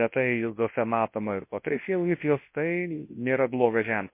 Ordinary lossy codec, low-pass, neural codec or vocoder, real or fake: MP3, 24 kbps; 3.6 kHz; codec, 24 kHz, 0.9 kbps, WavTokenizer, large speech release; fake